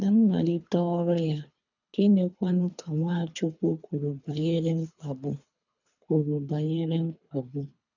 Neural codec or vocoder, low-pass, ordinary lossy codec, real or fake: codec, 24 kHz, 3 kbps, HILCodec; 7.2 kHz; none; fake